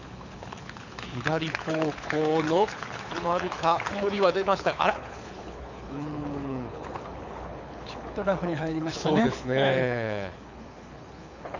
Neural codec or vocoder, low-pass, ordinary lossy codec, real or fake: codec, 24 kHz, 6 kbps, HILCodec; 7.2 kHz; none; fake